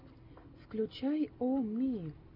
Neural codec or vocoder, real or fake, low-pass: none; real; 5.4 kHz